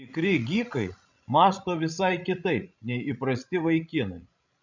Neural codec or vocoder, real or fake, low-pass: codec, 16 kHz, 16 kbps, FreqCodec, larger model; fake; 7.2 kHz